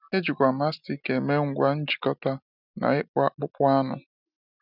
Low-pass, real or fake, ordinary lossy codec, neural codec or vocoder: 5.4 kHz; real; none; none